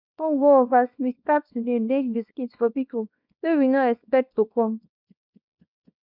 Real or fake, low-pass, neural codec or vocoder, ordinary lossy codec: fake; 5.4 kHz; codec, 24 kHz, 0.9 kbps, WavTokenizer, small release; none